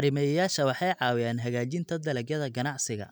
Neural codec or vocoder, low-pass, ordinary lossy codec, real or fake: none; none; none; real